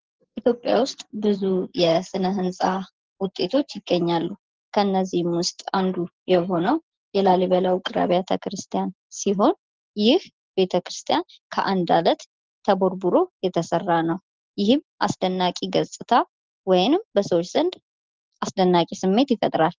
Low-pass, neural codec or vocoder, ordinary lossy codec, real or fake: 7.2 kHz; none; Opus, 16 kbps; real